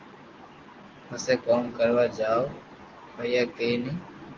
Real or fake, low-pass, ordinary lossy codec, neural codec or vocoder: real; 7.2 kHz; Opus, 16 kbps; none